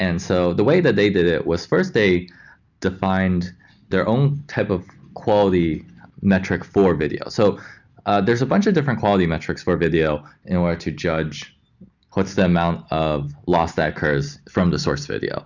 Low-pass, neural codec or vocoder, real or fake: 7.2 kHz; none; real